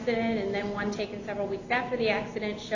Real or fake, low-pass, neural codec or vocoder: real; 7.2 kHz; none